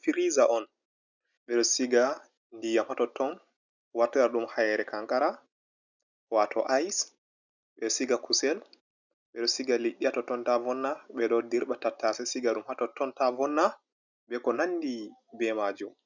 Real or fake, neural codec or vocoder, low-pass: real; none; 7.2 kHz